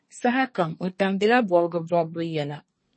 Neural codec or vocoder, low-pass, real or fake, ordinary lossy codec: codec, 24 kHz, 1 kbps, SNAC; 9.9 kHz; fake; MP3, 32 kbps